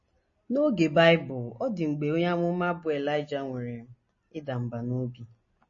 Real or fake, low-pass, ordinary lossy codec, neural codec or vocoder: real; 7.2 kHz; MP3, 32 kbps; none